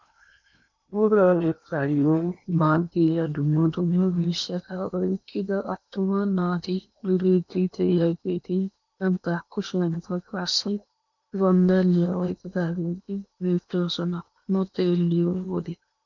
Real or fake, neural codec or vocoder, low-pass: fake; codec, 16 kHz in and 24 kHz out, 0.8 kbps, FocalCodec, streaming, 65536 codes; 7.2 kHz